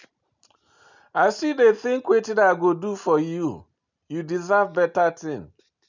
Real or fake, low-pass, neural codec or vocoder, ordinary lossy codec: real; 7.2 kHz; none; none